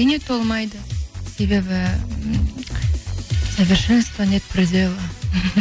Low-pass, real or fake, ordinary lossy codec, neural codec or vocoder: none; real; none; none